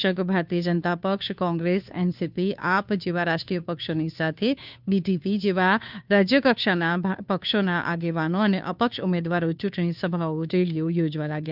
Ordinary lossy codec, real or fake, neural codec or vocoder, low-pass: none; fake; codec, 16 kHz, 2 kbps, FunCodec, trained on Chinese and English, 25 frames a second; 5.4 kHz